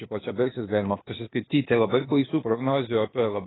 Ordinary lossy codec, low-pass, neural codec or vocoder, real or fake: AAC, 16 kbps; 7.2 kHz; codec, 16 kHz, 0.8 kbps, ZipCodec; fake